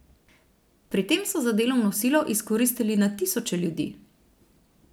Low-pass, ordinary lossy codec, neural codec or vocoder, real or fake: none; none; none; real